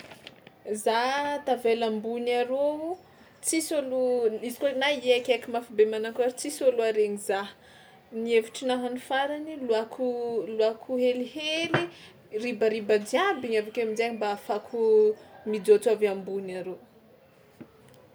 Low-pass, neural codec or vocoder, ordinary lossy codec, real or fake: none; none; none; real